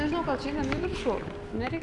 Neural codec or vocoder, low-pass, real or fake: none; 10.8 kHz; real